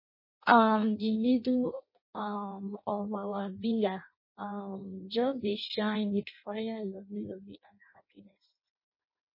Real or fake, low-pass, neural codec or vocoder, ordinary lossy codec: fake; 5.4 kHz; codec, 16 kHz in and 24 kHz out, 0.6 kbps, FireRedTTS-2 codec; MP3, 24 kbps